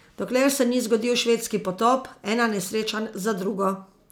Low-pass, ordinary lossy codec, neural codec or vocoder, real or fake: none; none; none; real